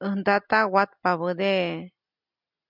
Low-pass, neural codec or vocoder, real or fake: 5.4 kHz; none; real